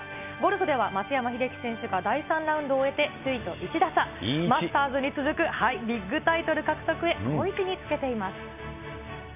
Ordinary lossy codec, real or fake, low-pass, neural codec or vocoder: none; real; 3.6 kHz; none